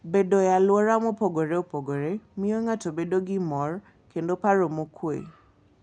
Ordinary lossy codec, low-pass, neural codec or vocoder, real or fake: none; 9.9 kHz; none; real